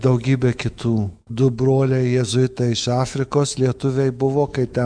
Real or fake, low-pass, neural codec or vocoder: fake; 9.9 kHz; autoencoder, 48 kHz, 128 numbers a frame, DAC-VAE, trained on Japanese speech